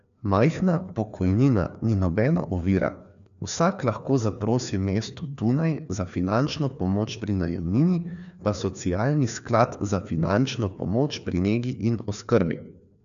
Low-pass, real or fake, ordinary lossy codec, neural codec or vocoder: 7.2 kHz; fake; none; codec, 16 kHz, 2 kbps, FreqCodec, larger model